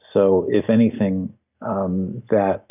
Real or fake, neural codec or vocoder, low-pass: real; none; 3.6 kHz